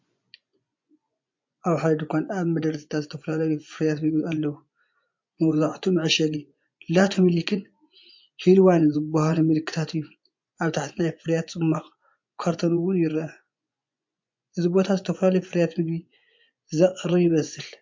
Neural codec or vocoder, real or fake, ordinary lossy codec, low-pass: vocoder, 24 kHz, 100 mel bands, Vocos; fake; MP3, 48 kbps; 7.2 kHz